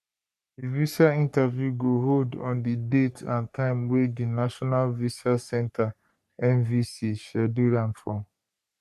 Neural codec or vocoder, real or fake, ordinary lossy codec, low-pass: codec, 44.1 kHz, 3.4 kbps, Pupu-Codec; fake; none; 14.4 kHz